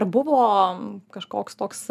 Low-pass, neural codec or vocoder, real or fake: 14.4 kHz; none; real